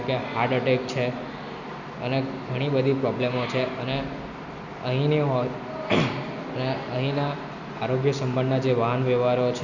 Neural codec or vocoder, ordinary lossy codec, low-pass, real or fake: none; none; 7.2 kHz; real